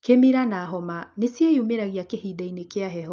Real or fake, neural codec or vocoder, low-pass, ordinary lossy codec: real; none; 7.2 kHz; Opus, 24 kbps